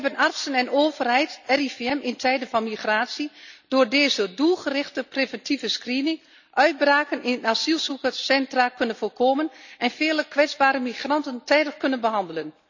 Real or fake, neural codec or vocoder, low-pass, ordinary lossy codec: real; none; 7.2 kHz; none